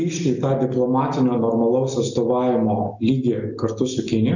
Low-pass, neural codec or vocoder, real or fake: 7.2 kHz; none; real